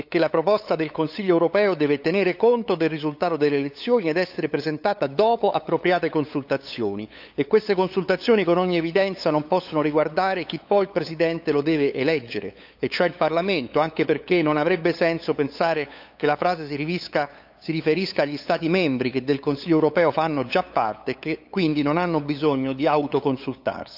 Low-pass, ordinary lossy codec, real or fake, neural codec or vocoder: 5.4 kHz; AAC, 48 kbps; fake; codec, 16 kHz, 8 kbps, FunCodec, trained on LibriTTS, 25 frames a second